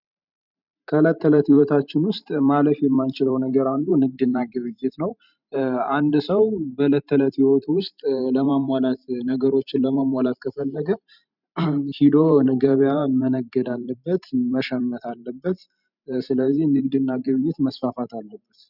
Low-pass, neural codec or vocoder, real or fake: 5.4 kHz; vocoder, 44.1 kHz, 128 mel bands every 512 samples, BigVGAN v2; fake